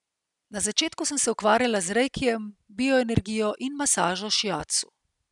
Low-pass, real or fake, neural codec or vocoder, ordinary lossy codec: 10.8 kHz; real; none; none